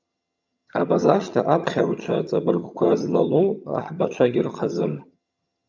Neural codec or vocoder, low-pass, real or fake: vocoder, 22.05 kHz, 80 mel bands, HiFi-GAN; 7.2 kHz; fake